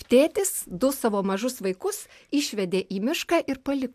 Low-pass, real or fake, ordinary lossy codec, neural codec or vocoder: 14.4 kHz; real; AAC, 96 kbps; none